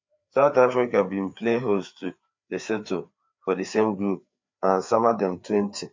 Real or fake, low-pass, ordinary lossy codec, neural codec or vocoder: fake; 7.2 kHz; MP3, 48 kbps; codec, 16 kHz, 4 kbps, FreqCodec, larger model